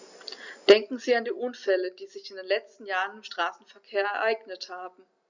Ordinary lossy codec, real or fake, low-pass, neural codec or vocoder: Opus, 64 kbps; real; 7.2 kHz; none